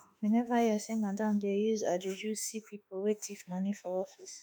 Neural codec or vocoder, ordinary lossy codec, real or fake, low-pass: autoencoder, 48 kHz, 32 numbers a frame, DAC-VAE, trained on Japanese speech; none; fake; none